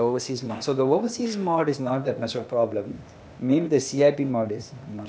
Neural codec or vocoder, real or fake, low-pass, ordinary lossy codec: codec, 16 kHz, 0.8 kbps, ZipCodec; fake; none; none